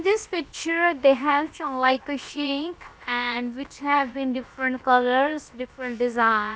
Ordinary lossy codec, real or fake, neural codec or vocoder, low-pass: none; fake; codec, 16 kHz, about 1 kbps, DyCAST, with the encoder's durations; none